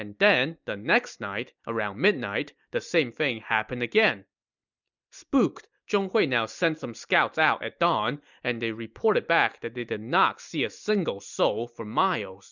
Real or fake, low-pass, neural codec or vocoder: real; 7.2 kHz; none